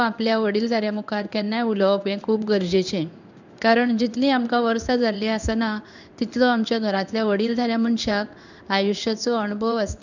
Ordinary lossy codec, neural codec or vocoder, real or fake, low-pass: none; codec, 16 kHz in and 24 kHz out, 1 kbps, XY-Tokenizer; fake; 7.2 kHz